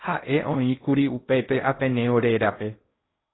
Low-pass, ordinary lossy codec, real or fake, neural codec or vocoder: 7.2 kHz; AAC, 16 kbps; fake; codec, 16 kHz in and 24 kHz out, 0.8 kbps, FocalCodec, streaming, 65536 codes